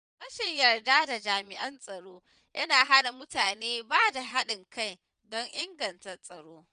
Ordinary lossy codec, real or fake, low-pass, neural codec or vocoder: none; fake; 9.9 kHz; vocoder, 22.05 kHz, 80 mel bands, Vocos